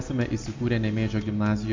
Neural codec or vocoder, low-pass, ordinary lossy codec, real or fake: none; 7.2 kHz; MP3, 64 kbps; real